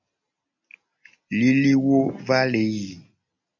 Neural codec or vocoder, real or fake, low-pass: none; real; 7.2 kHz